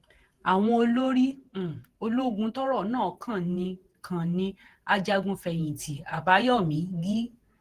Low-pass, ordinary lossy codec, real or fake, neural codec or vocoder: 14.4 kHz; Opus, 16 kbps; fake; vocoder, 48 kHz, 128 mel bands, Vocos